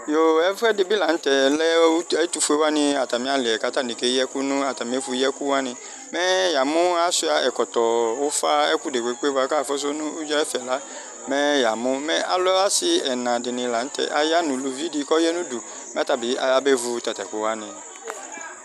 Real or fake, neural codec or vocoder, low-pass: real; none; 14.4 kHz